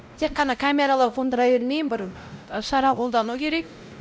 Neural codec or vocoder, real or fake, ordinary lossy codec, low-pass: codec, 16 kHz, 0.5 kbps, X-Codec, WavLM features, trained on Multilingual LibriSpeech; fake; none; none